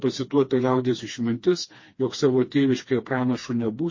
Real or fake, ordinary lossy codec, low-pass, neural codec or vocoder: fake; MP3, 32 kbps; 7.2 kHz; codec, 16 kHz, 2 kbps, FreqCodec, smaller model